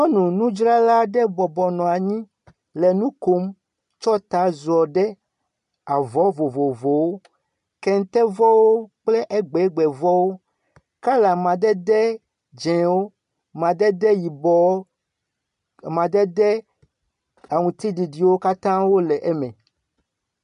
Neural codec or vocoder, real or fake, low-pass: none; real; 10.8 kHz